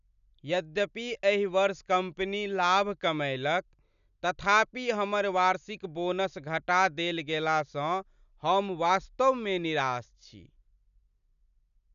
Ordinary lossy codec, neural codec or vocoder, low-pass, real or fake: none; none; 7.2 kHz; real